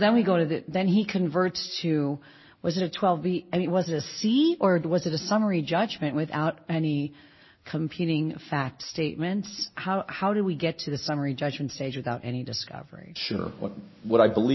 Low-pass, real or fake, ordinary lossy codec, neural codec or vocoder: 7.2 kHz; real; MP3, 24 kbps; none